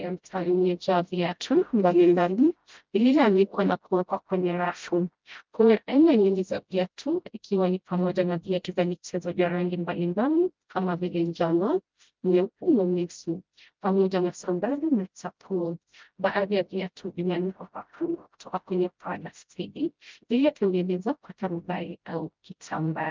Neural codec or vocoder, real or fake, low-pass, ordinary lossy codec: codec, 16 kHz, 0.5 kbps, FreqCodec, smaller model; fake; 7.2 kHz; Opus, 24 kbps